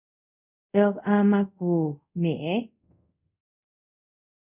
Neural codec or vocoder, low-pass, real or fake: codec, 24 kHz, 0.5 kbps, DualCodec; 3.6 kHz; fake